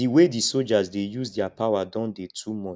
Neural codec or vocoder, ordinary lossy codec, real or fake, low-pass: none; none; real; none